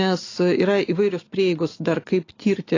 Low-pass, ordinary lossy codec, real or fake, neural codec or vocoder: 7.2 kHz; AAC, 32 kbps; real; none